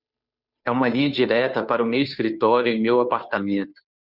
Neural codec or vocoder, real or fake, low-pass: codec, 16 kHz, 2 kbps, FunCodec, trained on Chinese and English, 25 frames a second; fake; 5.4 kHz